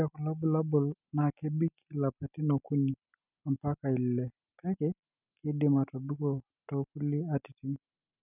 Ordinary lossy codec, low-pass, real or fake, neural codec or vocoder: none; 3.6 kHz; real; none